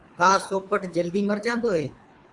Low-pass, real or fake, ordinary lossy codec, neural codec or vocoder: 10.8 kHz; fake; Opus, 64 kbps; codec, 24 kHz, 3 kbps, HILCodec